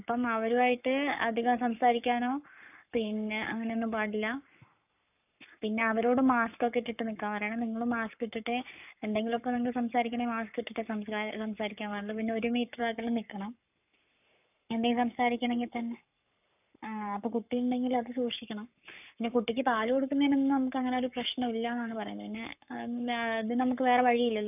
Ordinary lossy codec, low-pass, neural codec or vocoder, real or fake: none; 3.6 kHz; codec, 44.1 kHz, 7.8 kbps, Pupu-Codec; fake